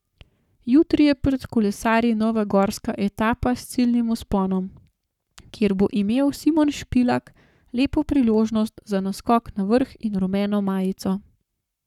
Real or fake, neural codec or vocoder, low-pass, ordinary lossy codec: fake; codec, 44.1 kHz, 7.8 kbps, Pupu-Codec; 19.8 kHz; none